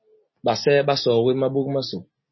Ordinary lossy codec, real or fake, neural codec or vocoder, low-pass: MP3, 24 kbps; real; none; 7.2 kHz